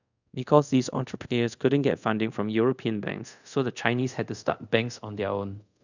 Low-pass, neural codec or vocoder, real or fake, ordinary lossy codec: 7.2 kHz; codec, 24 kHz, 0.5 kbps, DualCodec; fake; none